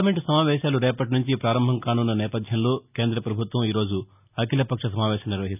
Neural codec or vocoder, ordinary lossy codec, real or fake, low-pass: none; none; real; 3.6 kHz